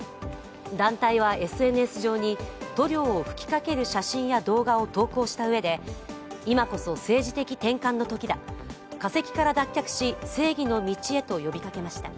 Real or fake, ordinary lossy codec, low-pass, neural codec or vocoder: real; none; none; none